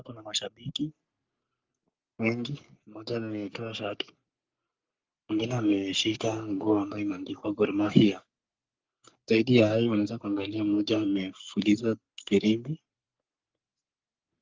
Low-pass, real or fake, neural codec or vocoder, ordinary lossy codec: 7.2 kHz; fake; codec, 44.1 kHz, 3.4 kbps, Pupu-Codec; Opus, 24 kbps